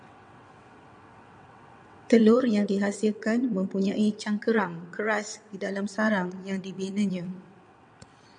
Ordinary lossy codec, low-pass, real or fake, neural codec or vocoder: MP3, 96 kbps; 9.9 kHz; fake; vocoder, 22.05 kHz, 80 mel bands, WaveNeXt